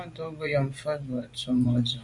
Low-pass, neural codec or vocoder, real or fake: 10.8 kHz; vocoder, 24 kHz, 100 mel bands, Vocos; fake